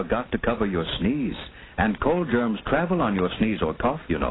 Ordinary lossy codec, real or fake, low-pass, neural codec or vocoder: AAC, 16 kbps; real; 7.2 kHz; none